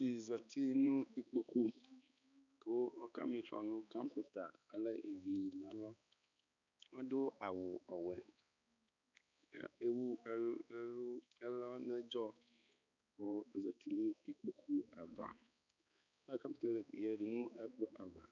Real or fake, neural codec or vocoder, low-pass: fake; codec, 16 kHz, 2 kbps, X-Codec, HuBERT features, trained on balanced general audio; 7.2 kHz